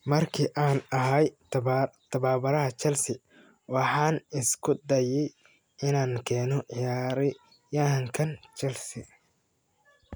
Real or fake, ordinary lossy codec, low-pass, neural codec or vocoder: real; none; none; none